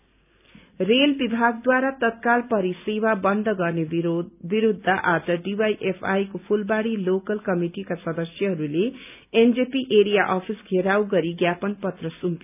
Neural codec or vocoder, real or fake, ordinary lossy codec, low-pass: none; real; none; 3.6 kHz